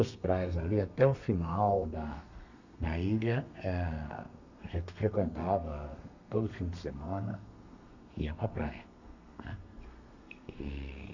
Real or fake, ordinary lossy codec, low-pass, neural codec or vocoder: fake; none; 7.2 kHz; codec, 32 kHz, 1.9 kbps, SNAC